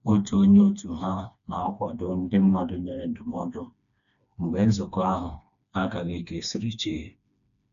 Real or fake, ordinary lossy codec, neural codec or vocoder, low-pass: fake; none; codec, 16 kHz, 2 kbps, FreqCodec, smaller model; 7.2 kHz